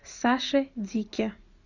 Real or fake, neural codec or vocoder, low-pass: real; none; 7.2 kHz